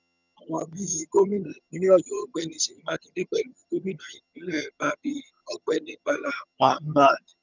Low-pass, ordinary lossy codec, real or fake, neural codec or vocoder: 7.2 kHz; none; fake; vocoder, 22.05 kHz, 80 mel bands, HiFi-GAN